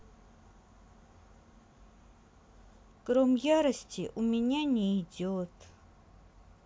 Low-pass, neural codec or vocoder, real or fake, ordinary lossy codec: none; none; real; none